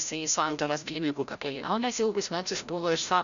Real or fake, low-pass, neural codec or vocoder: fake; 7.2 kHz; codec, 16 kHz, 0.5 kbps, FreqCodec, larger model